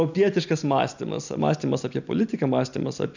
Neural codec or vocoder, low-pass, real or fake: none; 7.2 kHz; real